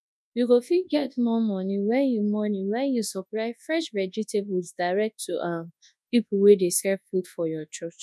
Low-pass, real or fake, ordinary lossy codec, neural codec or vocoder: none; fake; none; codec, 24 kHz, 0.9 kbps, WavTokenizer, large speech release